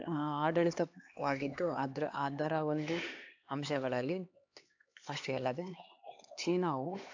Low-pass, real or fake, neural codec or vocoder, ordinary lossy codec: 7.2 kHz; fake; codec, 16 kHz, 2 kbps, X-Codec, HuBERT features, trained on LibriSpeech; AAC, 48 kbps